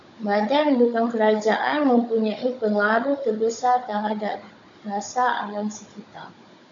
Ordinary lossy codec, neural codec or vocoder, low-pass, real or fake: AAC, 64 kbps; codec, 16 kHz, 16 kbps, FunCodec, trained on Chinese and English, 50 frames a second; 7.2 kHz; fake